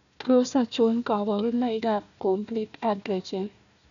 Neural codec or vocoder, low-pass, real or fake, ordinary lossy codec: codec, 16 kHz, 1 kbps, FunCodec, trained on Chinese and English, 50 frames a second; 7.2 kHz; fake; none